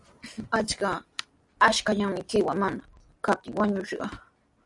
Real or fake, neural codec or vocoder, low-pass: real; none; 10.8 kHz